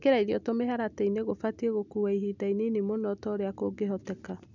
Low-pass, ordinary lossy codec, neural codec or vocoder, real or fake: 7.2 kHz; none; none; real